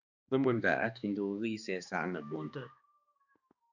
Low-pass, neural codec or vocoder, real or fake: 7.2 kHz; codec, 16 kHz, 2 kbps, X-Codec, HuBERT features, trained on balanced general audio; fake